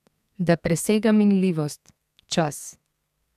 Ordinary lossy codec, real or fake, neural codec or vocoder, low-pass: none; fake; codec, 32 kHz, 1.9 kbps, SNAC; 14.4 kHz